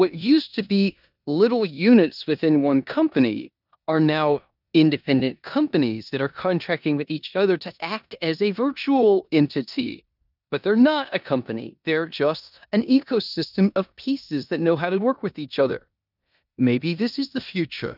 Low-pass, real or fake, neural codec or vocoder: 5.4 kHz; fake; codec, 16 kHz in and 24 kHz out, 0.9 kbps, LongCat-Audio-Codec, four codebook decoder